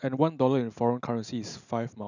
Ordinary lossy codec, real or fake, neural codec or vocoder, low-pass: none; real; none; 7.2 kHz